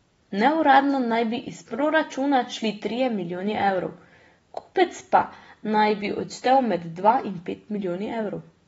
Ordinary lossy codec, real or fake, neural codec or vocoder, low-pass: AAC, 24 kbps; real; none; 10.8 kHz